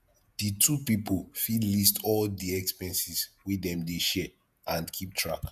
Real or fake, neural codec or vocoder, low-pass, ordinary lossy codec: fake; vocoder, 48 kHz, 128 mel bands, Vocos; 14.4 kHz; none